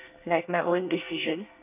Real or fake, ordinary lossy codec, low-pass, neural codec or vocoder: fake; none; 3.6 kHz; codec, 24 kHz, 1 kbps, SNAC